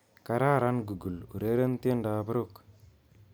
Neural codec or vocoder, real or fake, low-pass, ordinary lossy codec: none; real; none; none